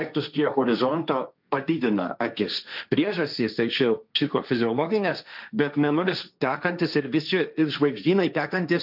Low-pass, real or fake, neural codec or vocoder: 5.4 kHz; fake; codec, 16 kHz, 1.1 kbps, Voila-Tokenizer